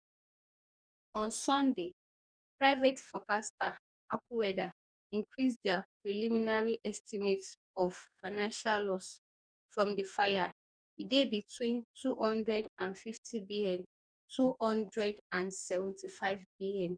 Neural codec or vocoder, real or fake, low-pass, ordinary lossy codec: codec, 44.1 kHz, 2.6 kbps, DAC; fake; 9.9 kHz; none